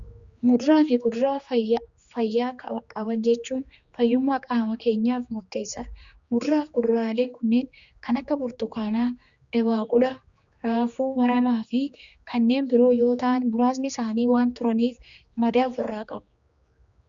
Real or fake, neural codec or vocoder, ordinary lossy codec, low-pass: fake; codec, 16 kHz, 2 kbps, X-Codec, HuBERT features, trained on general audio; Opus, 64 kbps; 7.2 kHz